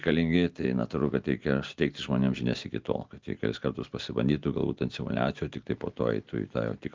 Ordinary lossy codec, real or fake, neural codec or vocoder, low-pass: Opus, 32 kbps; real; none; 7.2 kHz